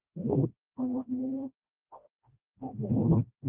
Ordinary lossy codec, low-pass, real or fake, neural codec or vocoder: Opus, 24 kbps; 3.6 kHz; fake; codec, 16 kHz, 1 kbps, FreqCodec, smaller model